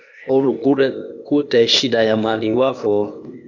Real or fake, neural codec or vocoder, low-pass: fake; codec, 16 kHz, 0.8 kbps, ZipCodec; 7.2 kHz